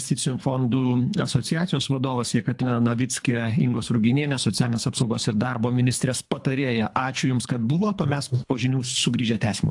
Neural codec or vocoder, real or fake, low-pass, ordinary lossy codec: codec, 24 kHz, 3 kbps, HILCodec; fake; 10.8 kHz; AAC, 64 kbps